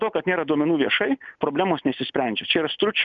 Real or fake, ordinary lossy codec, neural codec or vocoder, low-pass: real; MP3, 96 kbps; none; 7.2 kHz